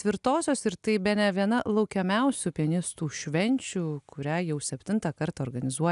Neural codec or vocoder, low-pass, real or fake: none; 10.8 kHz; real